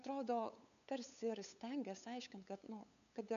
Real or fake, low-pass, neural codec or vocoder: fake; 7.2 kHz; codec, 16 kHz, 8 kbps, FunCodec, trained on LibriTTS, 25 frames a second